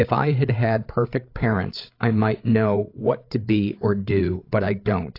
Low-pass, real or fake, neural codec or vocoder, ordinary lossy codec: 5.4 kHz; fake; codec, 16 kHz, 16 kbps, FreqCodec, larger model; AAC, 32 kbps